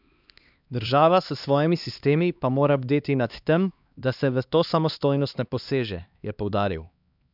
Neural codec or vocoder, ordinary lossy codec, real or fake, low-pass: codec, 16 kHz, 2 kbps, X-Codec, HuBERT features, trained on LibriSpeech; none; fake; 5.4 kHz